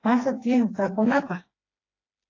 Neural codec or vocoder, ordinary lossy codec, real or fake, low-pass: codec, 16 kHz, 2 kbps, FreqCodec, smaller model; AAC, 32 kbps; fake; 7.2 kHz